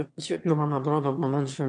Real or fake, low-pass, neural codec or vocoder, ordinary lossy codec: fake; 9.9 kHz; autoencoder, 22.05 kHz, a latent of 192 numbers a frame, VITS, trained on one speaker; MP3, 96 kbps